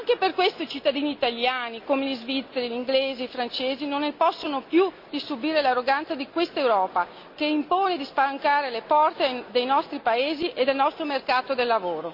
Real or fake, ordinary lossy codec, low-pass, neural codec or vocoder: real; MP3, 48 kbps; 5.4 kHz; none